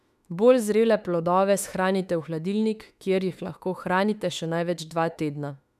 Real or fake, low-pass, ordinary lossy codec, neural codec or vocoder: fake; 14.4 kHz; none; autoencoder, 48 kHz, 32 numbers a frame, DAC-VAE, trained on Japanese speech